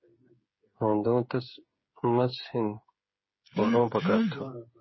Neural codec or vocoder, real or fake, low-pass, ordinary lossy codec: codec, 16 kHz, 8 kbps, FreqCodec, smaller model; fake; 7.2 kHz; MP3, 24 kbps